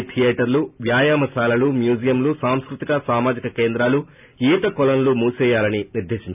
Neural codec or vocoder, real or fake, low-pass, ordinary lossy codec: none; real; 3.6 kHz; none